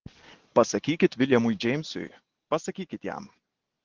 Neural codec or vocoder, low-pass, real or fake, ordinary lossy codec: none; 7.2 kHz; real; Opus, 16 kbps